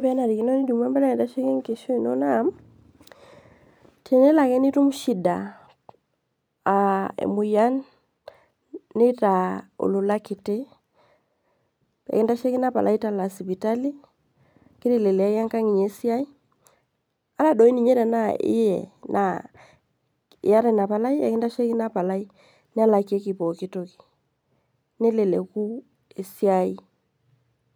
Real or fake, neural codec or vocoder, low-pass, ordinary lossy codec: real; none; none; none